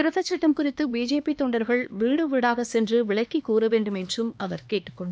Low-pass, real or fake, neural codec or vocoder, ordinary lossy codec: none; fake; codec, 16 kHz, 2 kbps, X-Codec, HuBERT features, trained on LibriSpeech; none